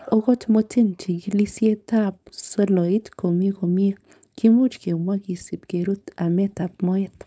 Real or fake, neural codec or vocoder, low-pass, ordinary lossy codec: fake; codec, 16 kHz, 4.8 kbps, FACodec; none; none